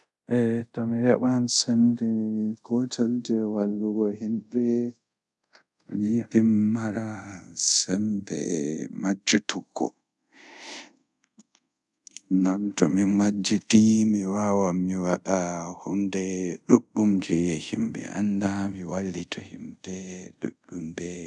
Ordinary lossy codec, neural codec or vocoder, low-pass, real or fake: none; codec, 24 kHz, 0.5 kbps, DualCodec; 10.8 kHz; fake